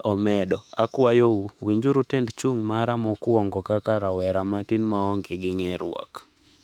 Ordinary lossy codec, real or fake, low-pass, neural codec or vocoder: none; fake; 19.8 kHz; autoencoder, 48 kHz, 32 numbers a frame, DAC-VAE, trained on Japanese speech